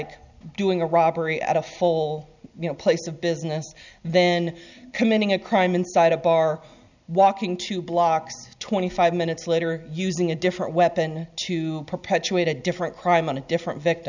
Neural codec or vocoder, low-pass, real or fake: none; 7.2 kHz; real